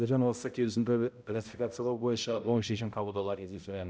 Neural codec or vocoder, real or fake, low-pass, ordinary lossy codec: codec, 16 kHz, 0.5 kbps, X-Codec, HuBERT features, trained on balanced general audio; fake; none; none